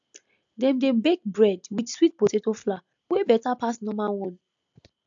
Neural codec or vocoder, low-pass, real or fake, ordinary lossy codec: none; 7.2 kHz; real; AAC, 64 kbps